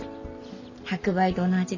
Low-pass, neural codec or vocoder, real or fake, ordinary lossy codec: 7.2 kHz; none; real; none